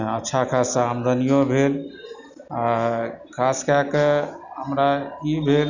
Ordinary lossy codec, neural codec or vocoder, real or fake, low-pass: none; none; real; 7.2 kHz